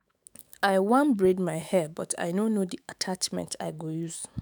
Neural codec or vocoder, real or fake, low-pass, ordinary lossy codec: autoencoder, 48 kHz, 128 numbers a frame, DAC-VAE, trained on Japanese speech; fake; none; none